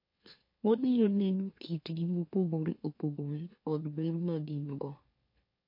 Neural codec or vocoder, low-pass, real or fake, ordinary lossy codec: autoencoder, 44.1 kHz, a latent of 192 numbers a frame, MeloTTS; 5.4 kHz; fake; MP3, 32 kbps